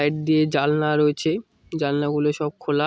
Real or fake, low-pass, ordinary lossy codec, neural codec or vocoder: real; none; none; none